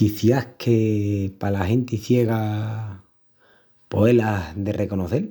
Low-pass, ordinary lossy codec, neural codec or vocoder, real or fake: none; none; none; real